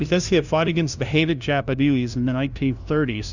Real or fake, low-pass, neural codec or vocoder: fake; 7.2 kHz; codec, 16 kHz, 0.5 kbps, FunCodec, trained on LibriTTS, 25 frames a second